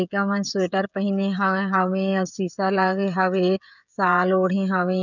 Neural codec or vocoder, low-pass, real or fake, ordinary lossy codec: codec, 16 kHz, 16 kbps, FreqCodec, smaller model; 7.2 kHz; fake; none